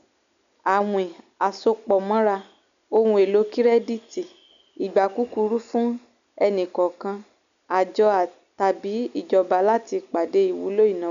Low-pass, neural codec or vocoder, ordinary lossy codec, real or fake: 7.2 kHz; none; none; real